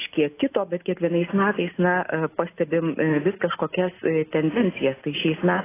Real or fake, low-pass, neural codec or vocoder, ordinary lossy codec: real; 3.6 kHz; none; AAC, 16 kbps